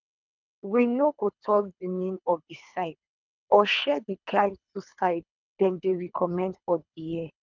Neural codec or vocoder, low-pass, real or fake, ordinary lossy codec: codec, 24 kHz, 3 kbps, HILCodec; 7.2 kHz; fake; none